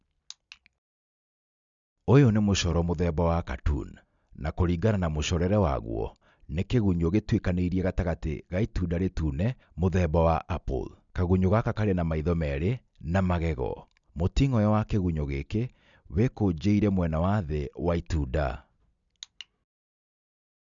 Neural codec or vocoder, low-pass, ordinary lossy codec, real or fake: none; 7.2 kHz; none; real